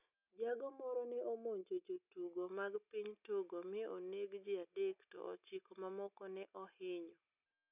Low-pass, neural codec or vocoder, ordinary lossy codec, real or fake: 3.6 kHz; none; none; real